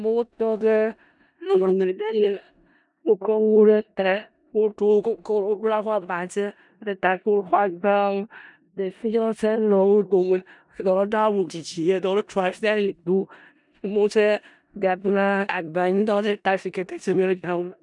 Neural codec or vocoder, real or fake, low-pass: codec, 16 kHz in and 24 kHz out, 0.4 kbps, LongCat-Audio-Codec, four codebook decoder; fake; 10.8 kHz